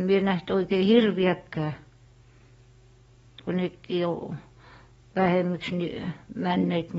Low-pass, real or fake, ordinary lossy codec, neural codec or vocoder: 19.8 kHz; fake; AAC, 24 kbps; codec, 44.1 kHz, 7.8 kbps, DAC